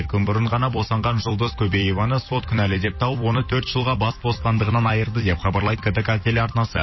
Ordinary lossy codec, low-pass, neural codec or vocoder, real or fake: MP3, 24 kbps; 7.2 kHz; vocoder, 44.1 kHz, 128 mel bands every 256 samples, BigVGAN v2; fake